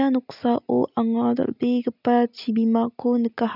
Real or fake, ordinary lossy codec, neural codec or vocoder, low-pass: real; none; none; 5.4 kHz